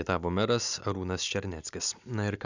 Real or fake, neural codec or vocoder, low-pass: real; none; 7.2 kHz